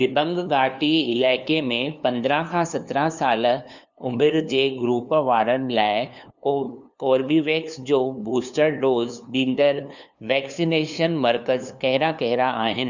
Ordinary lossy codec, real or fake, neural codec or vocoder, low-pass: none; fake; codec, 16 kHz, 2 kbps, FunCodec, trained on LibriTTS, 25 frames a second; 7.2 kHz